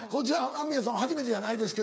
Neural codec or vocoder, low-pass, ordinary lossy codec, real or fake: codec, 16 kHz, 8 kbps, FreqCodec, smaller model; none; none; fake